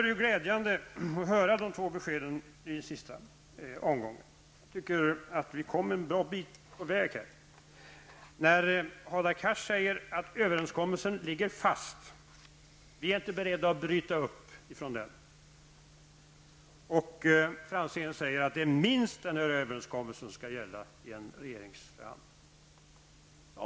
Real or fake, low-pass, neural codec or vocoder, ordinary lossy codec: real; none; none; none